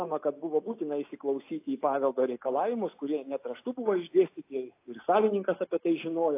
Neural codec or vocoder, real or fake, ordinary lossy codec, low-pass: none; real; AAC, 24 kbps; 3.6 kHz